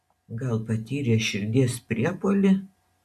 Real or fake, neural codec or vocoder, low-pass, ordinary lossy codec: real; none; 14.4 kHz; AAC, 96 kbps